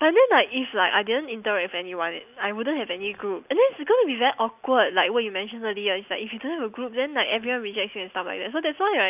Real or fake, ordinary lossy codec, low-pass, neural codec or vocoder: real; none; 3.6 kHz; none